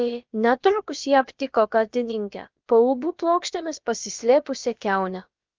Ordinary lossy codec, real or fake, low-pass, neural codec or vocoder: Opus, 24 kbps; fake; 7.2 kHz; codec, 16 kHz, about 1 kbps, DyCAST, with the encoder's durations